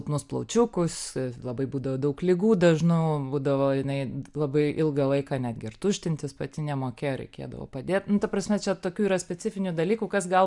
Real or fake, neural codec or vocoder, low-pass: real; none; 10.8 kHz